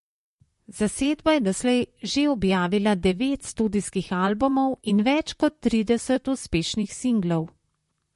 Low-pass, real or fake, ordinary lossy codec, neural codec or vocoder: 14.4 kHz; fake; MP3, 48 kbps; vocoder, 44.1 kHz, 128 mel bands, Pupu-Vocoder